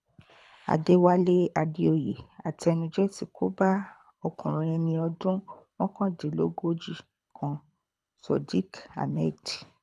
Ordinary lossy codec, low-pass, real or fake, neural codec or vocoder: none; none; fake; codec, 24 kHz, 6 kbps, HILCodec